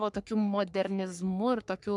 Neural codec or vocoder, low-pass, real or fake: codec, 44.1 kHz, 3.4 kbps, Pupu-Codec; 10.8 kHz; fake